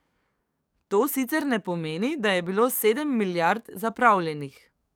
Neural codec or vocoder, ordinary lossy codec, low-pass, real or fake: codec, 44.1 kHz, 7.8 kbps, DAC; none; none; fake